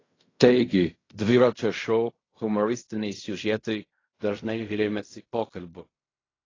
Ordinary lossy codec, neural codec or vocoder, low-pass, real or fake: AAC, 32 kbps; codec, 16 kHz in and 24 kHz out, 0.4 kbps, LongCat-Audio-Codec, fine tuned four codebook decoder; 7.2 kHz; fake